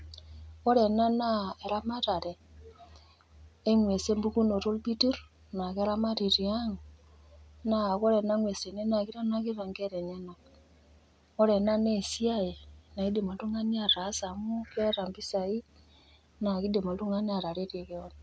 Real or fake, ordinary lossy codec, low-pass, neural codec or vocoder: real; none; none; none